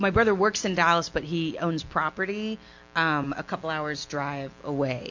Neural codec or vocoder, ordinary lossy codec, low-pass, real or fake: none; MP3, 48 kbps; 7.2 kHz; real